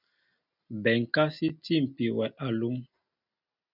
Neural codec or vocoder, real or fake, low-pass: none; real; 5.4 kHz